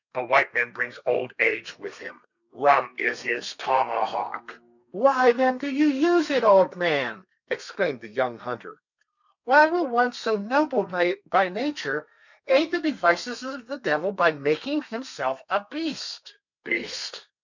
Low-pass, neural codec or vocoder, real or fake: 7.2 kHz; codec, 32 kHz, 1.9 kbps, SNAC; fake